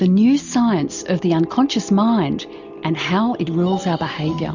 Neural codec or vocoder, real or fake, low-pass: none; real; 7.2 kHz